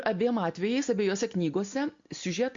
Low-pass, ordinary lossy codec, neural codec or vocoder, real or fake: 7.2 kHz; MP3, 48 kbps; none; real